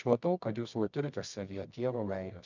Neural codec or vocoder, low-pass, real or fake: codec, 24 kHz, 0.9 kbps, WavTokenizer, medium music audio release; 7.2 kHz; fake